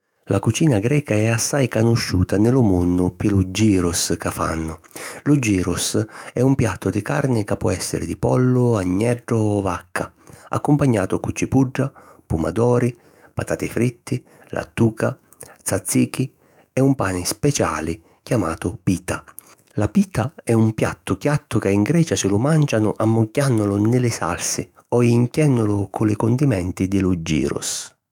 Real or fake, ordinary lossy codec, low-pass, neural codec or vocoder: fake; none; 19.8 kHz; autoencoder, 48 kHz, 128 numbers a frame, DAC-VAE, trained on Japanese speech